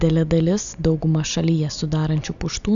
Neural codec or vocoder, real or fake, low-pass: none; real; 7.2 kHz